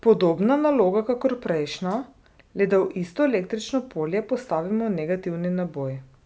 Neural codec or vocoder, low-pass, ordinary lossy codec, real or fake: none; none; none; real